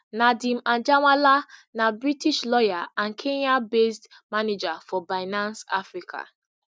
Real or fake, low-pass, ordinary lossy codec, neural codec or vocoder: real; none; none; none